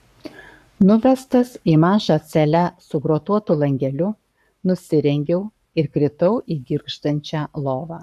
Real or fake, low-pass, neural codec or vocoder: fake; 14.4 kHz; codec, 44.1 kHz, 7.8 kbps, Pupu-Codec